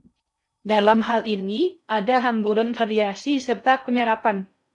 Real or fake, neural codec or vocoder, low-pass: fake; codec, 16 kHz in and 24 kHz out, 0.6 kbps, FocalCodec, streaming, 4096 codes; 10.8 kHz